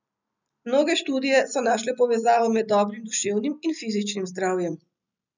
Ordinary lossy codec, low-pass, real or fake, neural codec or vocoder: none; 7.2 kHz; real; none